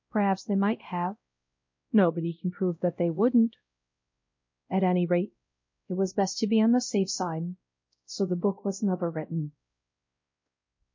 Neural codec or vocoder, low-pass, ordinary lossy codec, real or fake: codec, 16 kHz, 0.5 kbps, X-Codec, WavLM features, trained on Multilingual LibriSpeech; 7.2 kHz; MP3, 48 kbps; fake